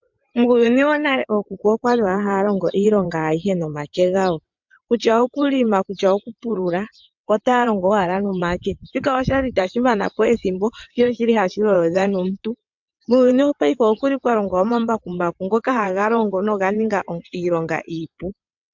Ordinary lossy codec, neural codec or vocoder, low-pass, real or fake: MP3, 64 kbps; vocoder, 22.05 kHz, 80 mel bands, WaveNeXt; 7.2 kHz; fake